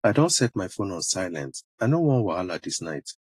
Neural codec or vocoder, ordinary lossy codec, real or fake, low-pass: none; AAC, 48 kbps; real; 14.4 kHz